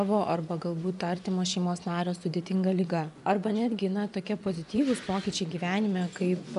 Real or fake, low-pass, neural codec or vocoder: fake; 10.8 kHz; vocoder, 24 kHz, 100 mel bands, Vocos